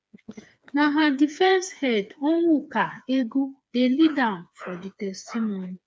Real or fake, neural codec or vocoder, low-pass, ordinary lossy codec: fake; codec, 16 kHz, 4 kbps, FreqCodec, smaller model; none; none